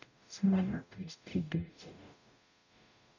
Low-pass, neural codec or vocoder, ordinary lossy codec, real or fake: 7.2 kHz; codec, 44.1 kHz, 0.9 kbps, DAC; none; fake